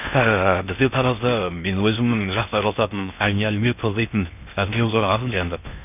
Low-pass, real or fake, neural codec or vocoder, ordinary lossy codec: 3.6 kHz; fake; codec, 16 kHz in and 24 kHz out, 0.6 kbps, FocalCodec, streaming, 4096 codes; none